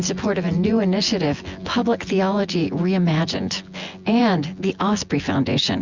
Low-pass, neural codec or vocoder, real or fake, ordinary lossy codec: 7.2 kHz; vocoder, 24 kHz, 100 mel bands, Vocos; fake; Opus, 64 kbps